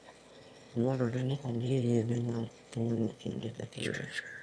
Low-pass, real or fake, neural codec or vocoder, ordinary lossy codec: none; fake; autoencoder, 22.05 kHz, a latent of 192 numbers a frame, VITS, trained on one speaker; none